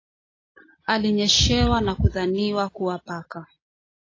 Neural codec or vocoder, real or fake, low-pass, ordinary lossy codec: none; real; 7.2 kHz; AAC, 32 kbps